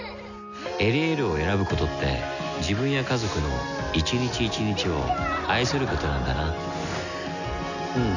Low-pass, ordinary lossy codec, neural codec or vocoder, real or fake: 7.2 kHz; none; none; real